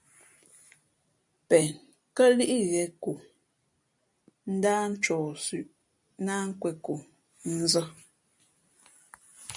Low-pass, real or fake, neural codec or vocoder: 10.8 kHz; real; none